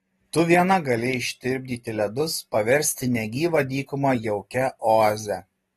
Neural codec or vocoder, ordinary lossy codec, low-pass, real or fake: none; AAC, 32 kbps; 19.8 kHz; real